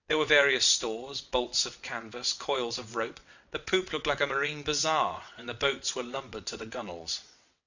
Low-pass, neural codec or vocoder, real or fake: 7.2 kHz; vocoder, 22.05 kHz, 80 mel bands, WaveNeXt; fake